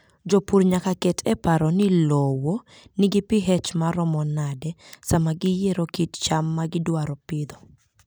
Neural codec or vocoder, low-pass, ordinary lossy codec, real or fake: none; none; none; real